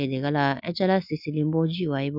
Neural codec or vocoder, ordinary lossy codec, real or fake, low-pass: none; none; real; 5.4 kHz